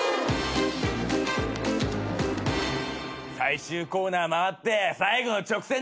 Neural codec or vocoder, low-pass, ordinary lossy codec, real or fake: none; none; none; real